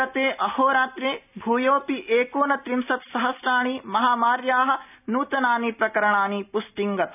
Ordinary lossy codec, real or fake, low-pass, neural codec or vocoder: none; real; 3.6 kHz; none